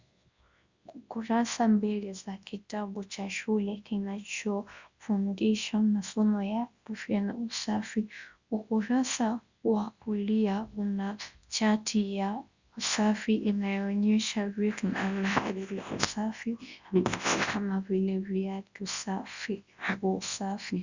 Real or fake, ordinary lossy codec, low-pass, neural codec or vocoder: fake; Opus, 64 kbps; 7.2 kHz; codec, 24 kHz, 0.9 kbps, WavTokenizer, large speech release